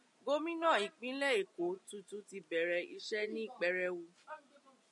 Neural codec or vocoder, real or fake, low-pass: none; real; 10.8 kHz